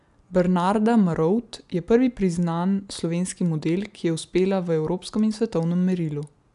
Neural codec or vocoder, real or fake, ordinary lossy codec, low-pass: none; real; none; 10.8 kHz